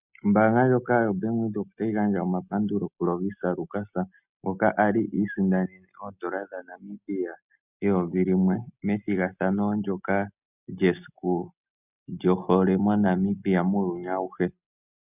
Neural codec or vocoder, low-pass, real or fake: none; 3.6 kHz; real